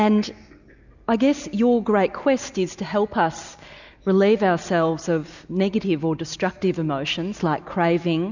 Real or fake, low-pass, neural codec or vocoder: real; 7.2 kHz; none